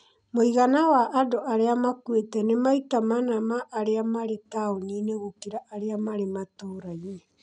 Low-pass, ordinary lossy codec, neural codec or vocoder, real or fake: 9.9 kHz; MP3, 96 kbps; none; real